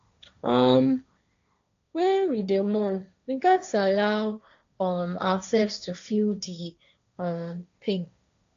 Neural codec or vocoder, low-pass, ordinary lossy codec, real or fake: codec, 16 kHz, 1.1 kbps, Voila-Tokenizer; 7.2 kHz; AAC, 64 kbps; fake